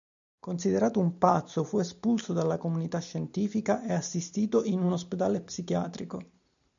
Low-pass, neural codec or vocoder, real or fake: 7.2 kHz; none; real